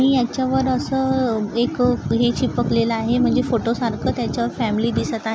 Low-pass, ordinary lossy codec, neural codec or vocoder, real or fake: none; none; none; real